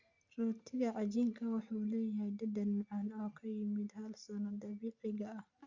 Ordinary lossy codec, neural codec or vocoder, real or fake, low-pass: Opus, 64 kbps; none; real; 7.2 kHz